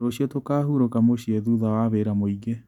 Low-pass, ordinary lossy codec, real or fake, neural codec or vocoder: 19.8 kHz; none; real; none